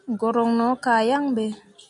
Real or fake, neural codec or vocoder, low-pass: real; none; 10.8 kHz